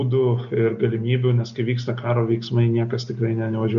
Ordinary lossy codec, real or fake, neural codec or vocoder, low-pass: MP3, 48 kbps; real; none; 7.2 kHz